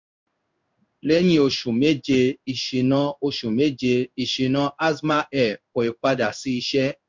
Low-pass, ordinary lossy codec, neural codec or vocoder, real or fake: 7.2 kHz; MP3, 64 kbps; codec, 16 kHz in and 24 kHz out, 1 kbps, XY-Tokenizer; fake